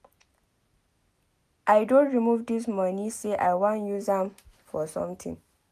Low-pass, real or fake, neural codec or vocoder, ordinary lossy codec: 14.4 kHz; real; none; none